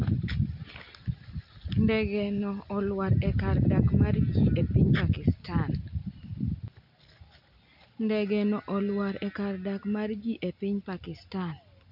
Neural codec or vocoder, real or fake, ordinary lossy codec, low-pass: none; real; none; 5.4 kHz